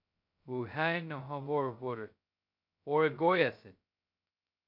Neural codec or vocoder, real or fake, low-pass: codec, 16 kHz, 0.2 kbps, FocalCodec; fake; 5.4 kHz